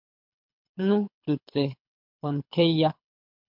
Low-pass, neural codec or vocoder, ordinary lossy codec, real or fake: 5.4 kHz; codec, 24 kHz, 6 kbps, HILCodec; MP3, 48 kbps; fake